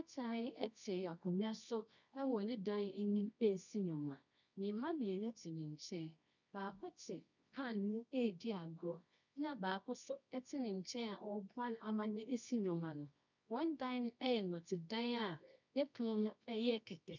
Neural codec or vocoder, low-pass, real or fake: codec, 24 kHz, 0.9 kbps, WavTokenizer, medium music audio release; 7.2 kHz; fake